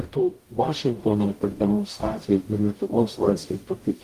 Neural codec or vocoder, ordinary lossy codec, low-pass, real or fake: codec, 44.1 kHz, 0.9 kbps, DAC; Opus, 24 kbps; 14.4 kHz; fake